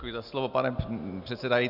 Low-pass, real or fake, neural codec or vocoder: 5.4 kHz; real; none